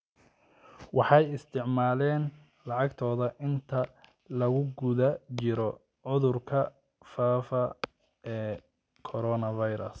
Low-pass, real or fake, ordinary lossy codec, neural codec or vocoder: none; real; none; none